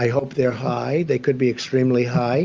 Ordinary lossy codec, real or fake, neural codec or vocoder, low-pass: Opus, 24 kbps; real; none; 7.2 kHz